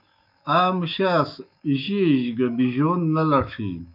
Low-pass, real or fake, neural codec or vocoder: 5.4 kHz; fake; autoencoder, 48 kHz, 128 numbers a frame, DAC-VAE, trained on Japanese speech